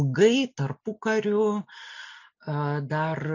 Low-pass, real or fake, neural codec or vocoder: 7.2 kHz; real; none